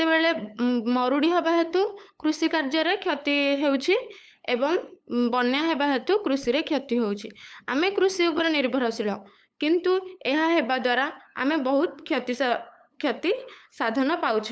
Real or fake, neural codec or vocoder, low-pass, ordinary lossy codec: fake; codec, 16 kHz, 8 kbps, FunCodec, trained on LibriTTS, 25 frames a second; none; none